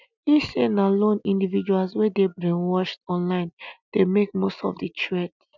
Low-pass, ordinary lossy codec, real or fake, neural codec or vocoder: 7.2 kHz; none; real; none